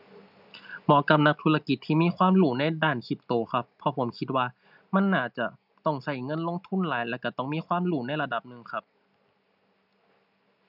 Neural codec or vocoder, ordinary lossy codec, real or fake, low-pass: none; none; real; 5.4 kHz